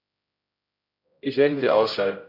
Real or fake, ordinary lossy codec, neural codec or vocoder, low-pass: fake; MP3, 48 kbps; codec, 16 kHz, 0.5 kbps, X-Codec, HuBERT features, trained on general audio; 5.4 kHz